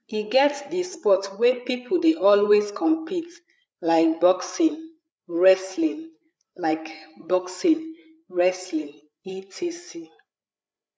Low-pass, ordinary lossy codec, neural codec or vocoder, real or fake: none; none; codec, 16 kHz, 8 kbps, FreqCodec, larger model; fake